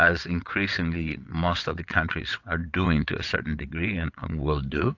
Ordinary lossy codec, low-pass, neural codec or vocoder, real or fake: AAC, 48 kbps; 7.2 kHz; vocoder, 22.05 kHz, 80 mel bands, WaveNeXt; fake